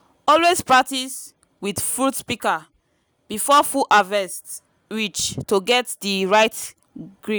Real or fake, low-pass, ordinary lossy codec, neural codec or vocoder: real; none; none; none